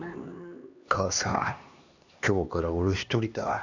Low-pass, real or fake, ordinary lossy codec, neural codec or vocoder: 7.2 kHz; fake; Opus, 64 kbps; codec, 16 kHz, 2 kbps, X-Codec, HuBERT features, trained on LibriSpeech